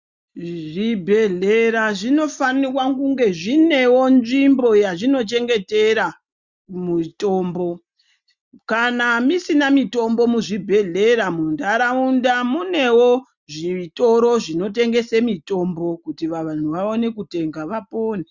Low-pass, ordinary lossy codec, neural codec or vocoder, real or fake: 7.2 kHz; Opus, 64 kbps; none; real